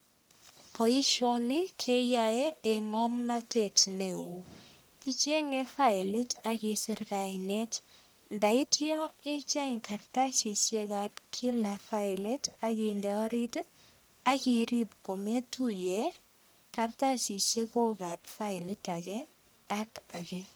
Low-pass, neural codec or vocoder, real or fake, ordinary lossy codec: none; codec, 44.1 kHz, 1.7 kbps, Pupu-Codec; fake; none